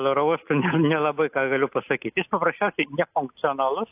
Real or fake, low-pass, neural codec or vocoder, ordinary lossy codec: real; 3.6 kHz; none; AAC, 32 kbps